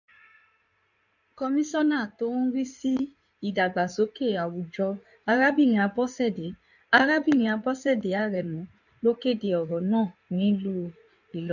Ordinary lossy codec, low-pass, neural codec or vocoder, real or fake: none; 7.2 kHz; codec, 16 kHz in and 24 kHz out, 2.2 kbps, FireRedTTS-2 codec; fake